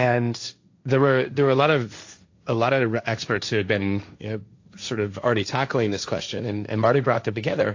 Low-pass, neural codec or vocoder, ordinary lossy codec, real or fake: 7.2 kHz; codec, 16 kHz, 1.1 kbps, Voila-Tokenizer; AAC, 48 kbps; fake